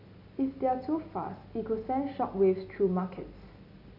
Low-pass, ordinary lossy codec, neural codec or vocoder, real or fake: 5.4 kHz; none; none; real